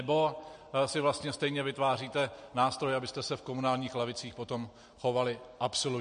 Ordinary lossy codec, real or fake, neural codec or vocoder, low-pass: MP3, 48 kbps; real; none; 9.9 kHz